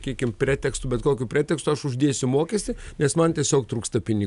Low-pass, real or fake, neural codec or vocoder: 10.8 kHz; real; none